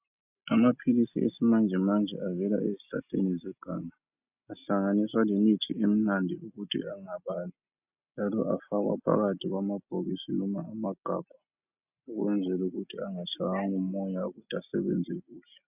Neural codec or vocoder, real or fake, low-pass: none; real; 3.6 kHz